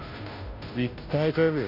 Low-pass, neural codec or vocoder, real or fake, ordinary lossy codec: 5.4 kHz; codec, 16 kHz, 0.5 kbps, FunCodec, trained on Chinese and English, 25 frames a second; fake; none